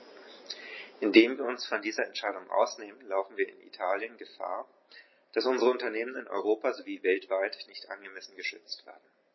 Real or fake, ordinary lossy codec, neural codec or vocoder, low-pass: real; MP3, 24 kbps; none; 7.2 kHz